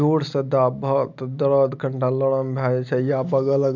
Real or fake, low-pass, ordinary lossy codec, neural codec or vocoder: real; 7.2 kHz; none; none